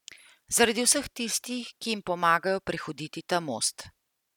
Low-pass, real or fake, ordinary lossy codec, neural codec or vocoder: 19.8 kHz; real; none; none